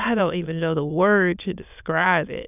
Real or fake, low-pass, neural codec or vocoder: fake; 3.6 kHz; autoencoder, 22.05 kHz, a latent of 192 numbers a frame, VITS, trained on many speakers